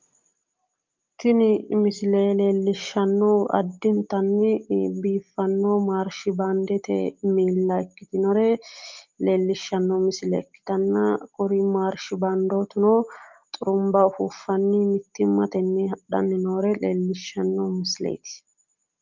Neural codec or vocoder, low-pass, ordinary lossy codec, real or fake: none; 7.2 kHz; Opus, 24 kbps; real